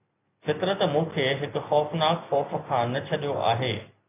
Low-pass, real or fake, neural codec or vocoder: 3.6 kHz; real; none